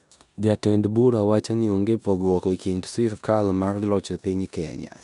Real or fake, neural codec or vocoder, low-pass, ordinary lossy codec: fake; codec, 16 kHz in and 24 kHz out, 0.9 kbps, LongCat-Audio-Codec, four codebook decoder; 10.8 kHz; none